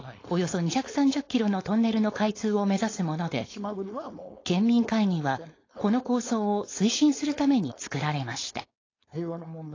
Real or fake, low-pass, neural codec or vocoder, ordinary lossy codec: fake; 7.2 kHz; codec, 16 kHz, 4.8 kbps, FACodec; AAC, 32 kbps